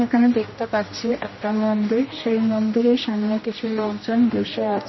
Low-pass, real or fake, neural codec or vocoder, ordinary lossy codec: 7.2 kHz; fake; codec, 16 kHz, 1 kbps, X-Codec, HuBERT features, trained on general audio; MP3, 24 kbps